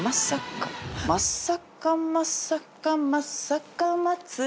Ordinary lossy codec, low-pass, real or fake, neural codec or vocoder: none; none; real; none